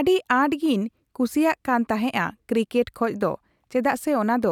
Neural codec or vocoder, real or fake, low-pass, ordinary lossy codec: none; real; 19.8 kHz; none